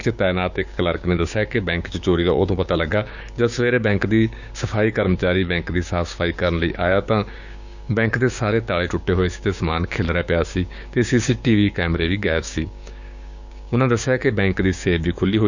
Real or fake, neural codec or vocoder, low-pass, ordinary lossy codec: fake; codec, 16 kHz, 6 kbps, DAC; 7.2 kHz; none